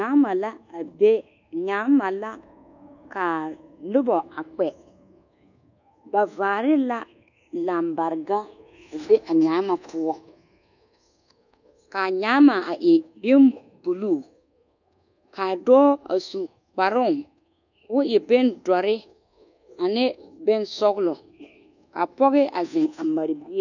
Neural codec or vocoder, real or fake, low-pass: codec, 24 kHz, 1.2 kbps, DualCodec; fake; 7.2 kHz